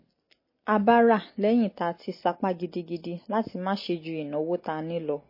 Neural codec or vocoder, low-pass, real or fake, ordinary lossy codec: none; 5.4 kHz; real; MP3, 24 kbps